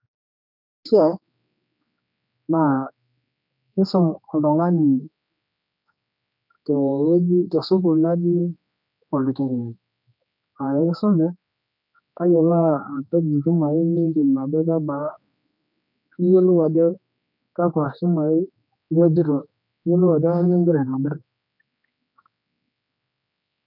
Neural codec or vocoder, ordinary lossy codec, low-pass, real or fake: codec, 16 kHz, 2 kbps, X-Codec, HuBERT features, trained on general audio; none; 5.4 kHz; fake